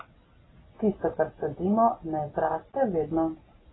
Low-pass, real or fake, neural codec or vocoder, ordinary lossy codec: 7.2 kHz; real; none; AAC, 16 kbps